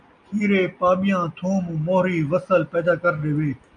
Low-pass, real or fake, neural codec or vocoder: 9.9 kHz; real; none